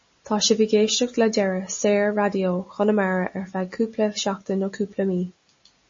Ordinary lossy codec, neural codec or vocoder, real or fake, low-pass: MP3, 32 kbps; none; real; 7.2 kHz